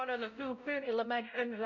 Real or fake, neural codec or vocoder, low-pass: fake; codec, 16 kHz, 0.5 kbps, X-Codec, WavLM features, trained on Multilingual LibriSpeech; 7.2 kHz